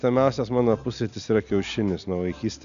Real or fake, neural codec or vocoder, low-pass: real; none; 7.2 kHz